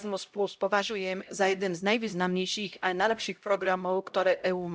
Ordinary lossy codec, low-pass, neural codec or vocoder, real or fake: none; none; codec, 16 kHz, 0.5 kbps, X-Codec, HuBERT features, trained on LibriSpeech; fake